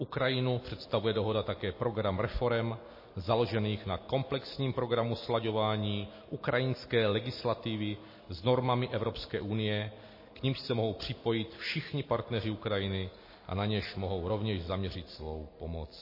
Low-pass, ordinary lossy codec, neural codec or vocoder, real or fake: 5.4 kHz; MP3, 24 kbps; none; real